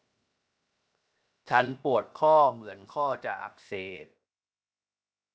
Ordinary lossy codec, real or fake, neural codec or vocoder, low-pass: none; fake; codec, 16 kHz, 0.7 kbps, FocalCodec; none